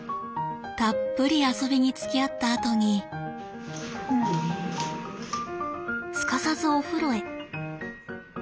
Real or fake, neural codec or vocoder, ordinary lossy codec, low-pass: real; none; none; none